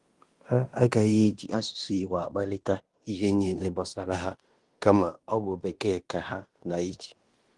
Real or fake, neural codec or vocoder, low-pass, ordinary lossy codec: fake; codec, 16 kHz in and 24 kHz out, 0.9 kbps, LongCat-Audio-Codec, fine tuned four codebook decoder; 10.8 kHz; Opus, 24 kbps